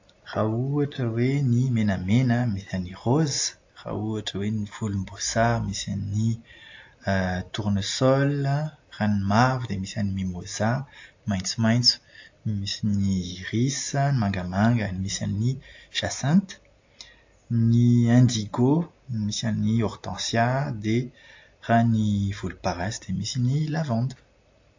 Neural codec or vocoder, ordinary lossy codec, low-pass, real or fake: none; AAC, 48 kbps; 7.2 kHz; real